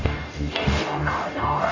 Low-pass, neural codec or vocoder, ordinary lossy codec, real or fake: 7.2 kHz; codec, 44.1 kHz, 0.9 kbps, DAC; none; fake